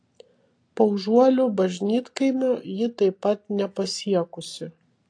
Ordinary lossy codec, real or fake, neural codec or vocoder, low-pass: AAC, 48 kbps; real; none; 9.9 kHz